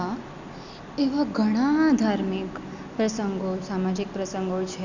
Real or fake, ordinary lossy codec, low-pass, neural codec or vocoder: real; none; 7.2 kHz; none